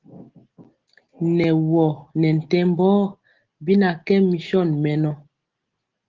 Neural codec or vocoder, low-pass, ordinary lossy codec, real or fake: none; 7.2 kHz; Opus, 16 kbps; real